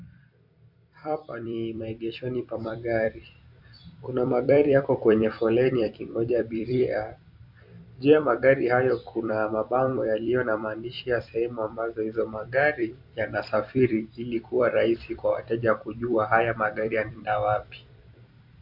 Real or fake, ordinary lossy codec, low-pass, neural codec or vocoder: fake; AAC, 48 kbps; 5.4 kHz; vocoder, 44.1 kHz, 128 mel bands every 256 samples, BigVGAN v2